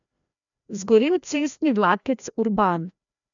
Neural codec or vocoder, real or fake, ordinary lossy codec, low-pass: codec, 16 kHz, 1 kbps, FreqCodec, larger model; fake; none; 7.2 kHz